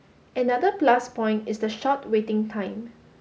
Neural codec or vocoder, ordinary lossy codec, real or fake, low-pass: none; none; real; none